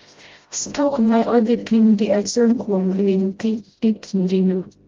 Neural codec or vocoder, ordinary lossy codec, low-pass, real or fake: codec, 16 kHz, 0.5 kbps, FreqCodec, smaller model; Opus, 32 kbps; 7.2 kHz; fake